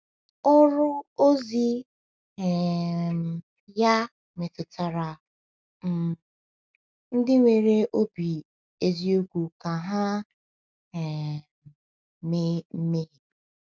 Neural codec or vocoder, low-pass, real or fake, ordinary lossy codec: none; none; real; none